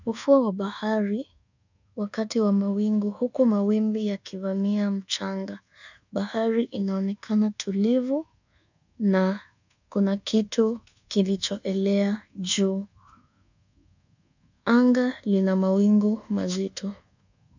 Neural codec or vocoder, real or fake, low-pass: codec, 24 kHz, 1.2 kbps, DualCodec; fake; 7.2 kHz